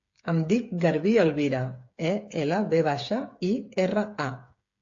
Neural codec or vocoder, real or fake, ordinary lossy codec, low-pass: codec, 16 kHz, 8 kbps, FreqCodec, smaller model; fake; AAC, 48 kbps; 7.2 kHz